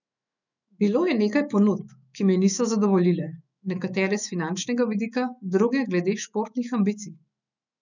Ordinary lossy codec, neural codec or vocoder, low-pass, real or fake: none; autoencoder, 48 kHz, 128 numbers a frame, DAC-VAE, trained on Japanese speech; 7.2 kHz; fake